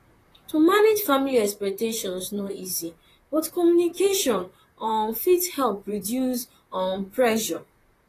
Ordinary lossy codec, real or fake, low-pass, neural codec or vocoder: AAC, 48 kbps; fake; 14.4 kHz; vocoder, 44.1 kHz, 128 mel bands, Pupu-Vocoder